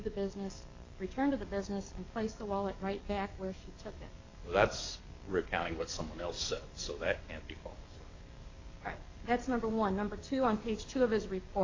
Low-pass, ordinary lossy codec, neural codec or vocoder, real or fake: 7.2 kHz; AAC, 32 kbps; codec, 44.1 kHz, 7.8 kbps, DAC; fake